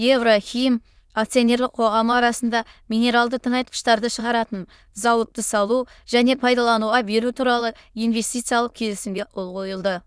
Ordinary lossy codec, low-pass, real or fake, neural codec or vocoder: none; none; fake; autoencoder, 22.05 kHz, a latent of 192 numbers a frame, VITS, trained on many speakers